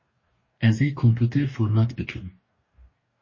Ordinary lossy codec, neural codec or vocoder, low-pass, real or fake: MP3, 32 kbps; codec, 44.1 kHz, 2.6 kbps, DAC; 7.2 kHz; fake